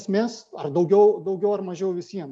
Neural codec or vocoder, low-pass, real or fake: none; 9.9 kHz; real